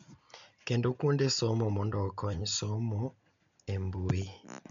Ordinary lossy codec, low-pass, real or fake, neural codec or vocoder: MP3, 64 kbps; 7.2 kHz; real; none